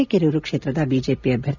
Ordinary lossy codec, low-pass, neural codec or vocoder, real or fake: none; 7.2 kHz; none; real